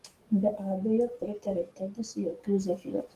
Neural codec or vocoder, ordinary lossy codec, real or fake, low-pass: codec, 44.1 kHz, 7.8 kbps, DAC; Opus, 24 kbps; fake; 14.4 kHz